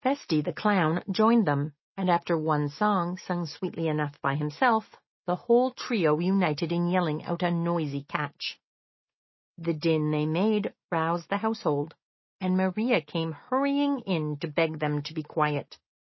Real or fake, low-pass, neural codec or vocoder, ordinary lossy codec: real; 7.2 kHz; none; MP3, 24 kbps